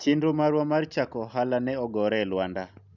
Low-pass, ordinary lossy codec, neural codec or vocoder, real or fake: 7.2 kHz; none; none; real